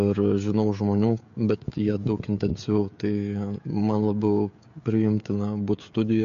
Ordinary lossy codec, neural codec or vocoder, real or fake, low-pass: MP3, 48 kbps; codec, 16 kHz, 16 kbps, FreqCodec, smaller model; fake; 7.2 kHz